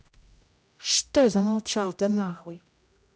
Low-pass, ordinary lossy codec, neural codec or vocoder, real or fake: none; none; codec, 16 kHz, 0.5 kbps, X-Codec, HuBERT features, trained on general audio; fake